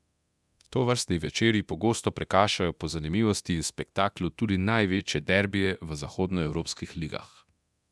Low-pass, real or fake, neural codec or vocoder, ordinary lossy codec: none; fake; codec, 24 kHz, 0.9 kbps, DualCodec; none